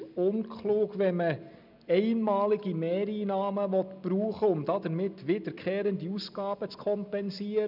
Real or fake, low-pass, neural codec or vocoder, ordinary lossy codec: real; 5.4 kHz; none; none